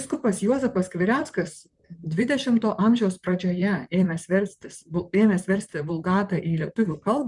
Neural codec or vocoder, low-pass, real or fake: vocoder, 44.1 kHz, 128 mel bands, Pupu-Vocoder; 10.8 kHz; fake